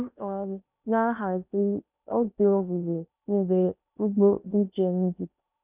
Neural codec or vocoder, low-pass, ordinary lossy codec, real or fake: codec, 16 kHz in and 24 kHz out, 0.8 kbps, FocalCodec, streaming, 65536 codes; 3.6 kHz; none; fake